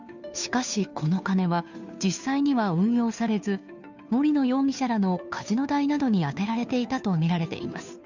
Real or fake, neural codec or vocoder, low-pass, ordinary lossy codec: fake; codec, 16 kHz, 2 kbps, FunCodec, trained on Chinese and English, 25 frames a second; 7.2 kHz; none